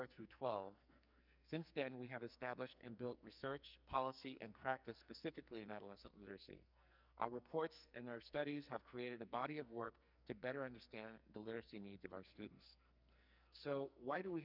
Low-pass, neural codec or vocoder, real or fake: 5.4 kHz; codec, 44.1 kHz, 2.6 kbps, SNAC; fake